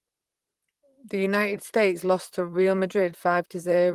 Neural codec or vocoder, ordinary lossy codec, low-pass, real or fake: vocoder, 44.1 kHz, 128 mel bands, Pupu-Vocoder; Opus, 24 kbps; 14.4 kHz; fake